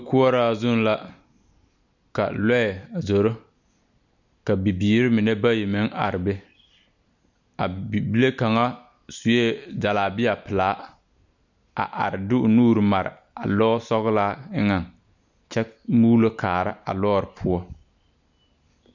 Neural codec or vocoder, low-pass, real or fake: none; 7.2 kHz; real